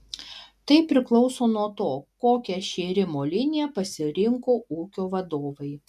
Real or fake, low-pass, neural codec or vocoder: real; 14.4 kHz; none